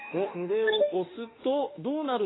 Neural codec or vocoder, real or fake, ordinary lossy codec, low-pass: autoencoder, 48 kHz, 32 numbers a frame, DAC-VAE, trained on Japanese speech; fake; AAC, 16 kbps; 7.2 kHz